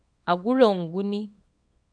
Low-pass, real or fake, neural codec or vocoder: 9.9 kHz; fake; codec, 24 kHz, 0.9 kbps, WavTokenizer, small release